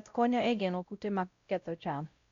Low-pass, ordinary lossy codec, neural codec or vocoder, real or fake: 7.2 kHz; Opus, 64 kbps; codec, 16 kHz, 0.5 kbps, X-Codec, WavLM features, trained on Multilingual LibriSpeech; fake